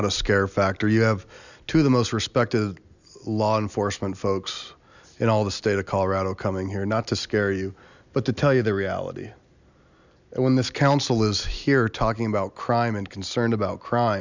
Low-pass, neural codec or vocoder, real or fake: 7.2 kHz; none; real